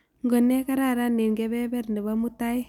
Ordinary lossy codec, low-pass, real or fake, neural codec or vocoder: none; 19.8 kHz; real; none